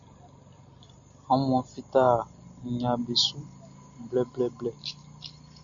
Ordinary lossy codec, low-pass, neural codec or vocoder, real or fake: AAC, 64 kbps; 7.2 kHz; none; real